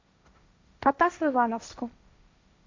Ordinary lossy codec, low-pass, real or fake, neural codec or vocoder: MP3, 64 kbps; 7.2 kHz; fake; codec, 16 kHz, 1.1 kbps, Voila-Tokenizer